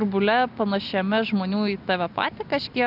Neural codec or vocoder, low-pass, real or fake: none; 5.4 kHz; real